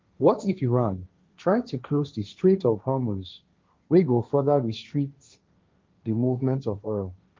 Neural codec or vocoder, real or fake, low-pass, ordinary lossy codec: codec, 16 kHz, 1.1 kbps, Voila-Tokenizer; fake; 7.2 kHz; Opus, 24 kbps